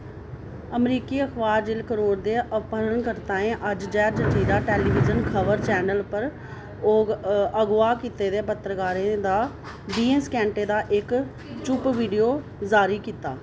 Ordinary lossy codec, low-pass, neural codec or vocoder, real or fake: none; none; none; real